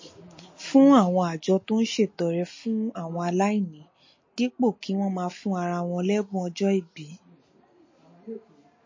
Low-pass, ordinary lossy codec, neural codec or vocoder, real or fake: 7.2 kHz; MP3, 32 kbps; none; real